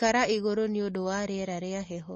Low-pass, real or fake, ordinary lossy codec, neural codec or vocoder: 9.9 kHz; real; MP3, 32 kbps; none